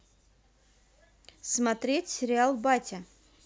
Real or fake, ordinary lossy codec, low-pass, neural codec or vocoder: real; none; none; none